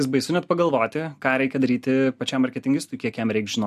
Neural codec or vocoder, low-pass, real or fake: none; 14.4 kHz; real